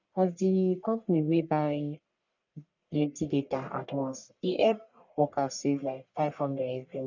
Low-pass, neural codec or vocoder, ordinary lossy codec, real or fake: 7.2 kHz; codec, 44.1 kHz, 1.7 kbps, Pupu-Codec; none; fake